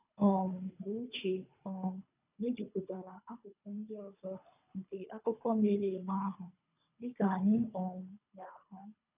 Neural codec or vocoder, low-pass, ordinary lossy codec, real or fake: codec, 24 kHz, 3 kbps, HILCodec; 3.6 kHz; none; fake